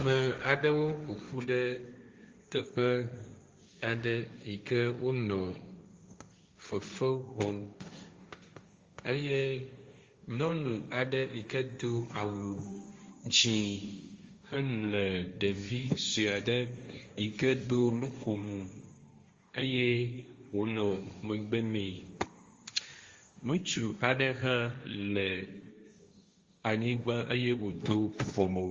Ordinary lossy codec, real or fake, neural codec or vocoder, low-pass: Opus, 32 kbps; fake; codec, 16 kHz, 1.1 kbps, Voila-Tokenizer; 7.2 kHz